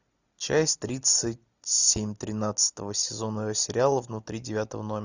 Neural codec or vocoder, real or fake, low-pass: none; real; 7.2 kHz